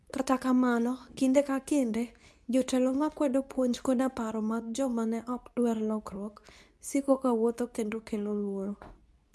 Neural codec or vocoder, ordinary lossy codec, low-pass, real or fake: codec, 24 kHz, 0.9 kbps, WavTokenizer, medium speech release version 2; none; none; fake